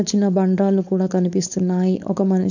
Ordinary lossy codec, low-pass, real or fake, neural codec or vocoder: none; 7.2 kHz; fake; codec, 16 kHz, 4.8 kbps, FACodec